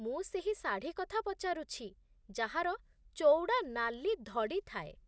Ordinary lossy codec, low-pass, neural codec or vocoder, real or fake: none; none; none; real